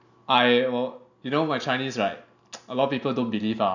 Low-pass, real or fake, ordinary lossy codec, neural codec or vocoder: 7.2 kHz; real; none; none